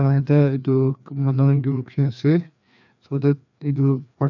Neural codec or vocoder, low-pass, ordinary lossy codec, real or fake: codec, 16 kHz, 2 kbps, FreqCodec, larger model; 7.2 kHz; none; fake